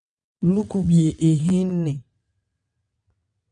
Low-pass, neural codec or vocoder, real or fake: 9.9 kHz; vocoder, 22.05 kHz, 80 mel bands, WaveNeXt; fake